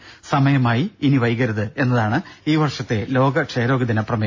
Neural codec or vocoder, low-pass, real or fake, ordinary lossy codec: none; 7.2 kHz; real; AAC, 48 kbps